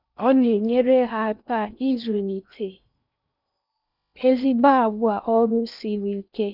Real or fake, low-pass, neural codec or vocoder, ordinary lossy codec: fake; 5.4 kHz; codec, 16 kHz in and 24 kHz out, 0.8 kbps, FocalCodec, streaming, 65536 codes; none